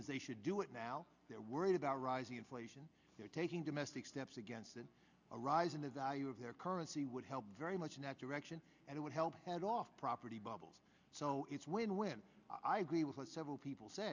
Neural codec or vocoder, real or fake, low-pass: none; real; 7.2 kHz